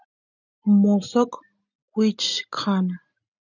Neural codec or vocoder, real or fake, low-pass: none; real; 7.2 kHz